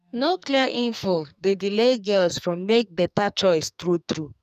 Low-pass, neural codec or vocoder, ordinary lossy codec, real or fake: 14.4 kHz; codec, 44.1 kHz, 2.6 kbps, SNAC; none; fake